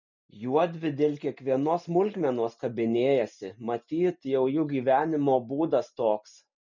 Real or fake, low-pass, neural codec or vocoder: real; 7.2 kHz; none